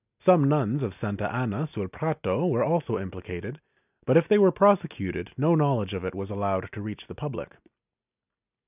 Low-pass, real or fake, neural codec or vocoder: 3.6 kHz; real; none